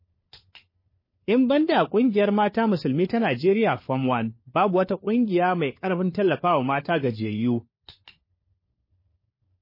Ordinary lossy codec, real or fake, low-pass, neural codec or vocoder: MP3, 24 kbps; fake; 5.4 kHz; codec, 16 kHz, 4 kbps, FunCodec, trained on LibriTTS, 50 frames a second